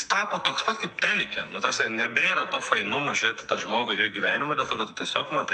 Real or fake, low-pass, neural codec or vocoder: fake; 9.9 kHz; codec, 44.1 kHz, 2.6 kbps, SNAC